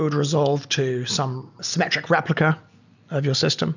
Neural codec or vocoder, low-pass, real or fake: none; 7.2 kHz; real